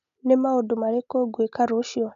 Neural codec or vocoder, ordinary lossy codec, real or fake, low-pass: none; none; real; 7.2 kHz